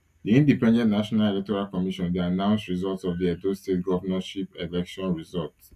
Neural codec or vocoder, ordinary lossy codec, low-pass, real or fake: none; none; 14.4 kHz; real